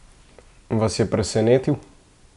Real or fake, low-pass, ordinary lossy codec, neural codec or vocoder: real; 10.8 kHz; none; none